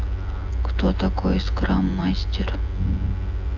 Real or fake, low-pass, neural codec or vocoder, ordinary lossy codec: fake; 7.2 kHz; vocoder, 24 kHz, 100 mel bands, Vocos; AAC, 48 kbps